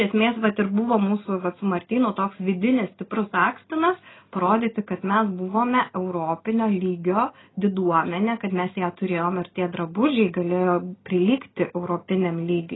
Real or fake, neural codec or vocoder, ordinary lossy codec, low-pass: real; none; AAC, 16 kbps; 7.2 kHz